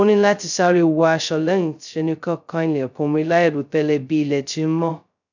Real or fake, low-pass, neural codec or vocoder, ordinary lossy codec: fake; 7.2 kHz; codec, 16 kHz, 0.2 kbps, FocalCodec; none